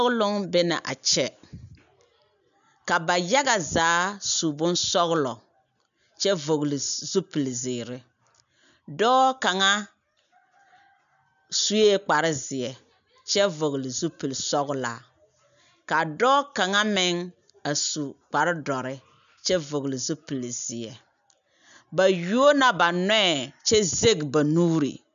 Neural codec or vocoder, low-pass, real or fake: none; 7.2 kHz; real